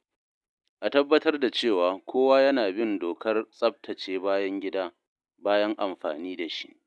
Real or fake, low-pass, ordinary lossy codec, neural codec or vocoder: real; none; none; none